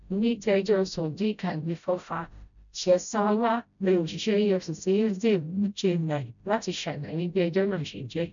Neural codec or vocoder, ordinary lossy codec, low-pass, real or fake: codec, 16 kHz, 0.5 kbps, FreqCodec, smaller model; none; 7.2 kHz; fake